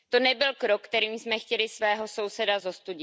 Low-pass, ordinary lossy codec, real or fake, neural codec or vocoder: none; none; real; none